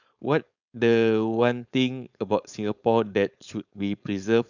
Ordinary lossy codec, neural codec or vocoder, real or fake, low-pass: none; codec, 16 kHz, 4.8 kbps, FACodec; fake; 7.2 kHz